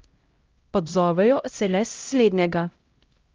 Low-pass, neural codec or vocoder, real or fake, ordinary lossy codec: 7.2 kHz; codec, 16 kHz, 0.5 kbps, X-Codec, HuBERT features, trained on LibriSpeech; fake; Opus, 32 kbps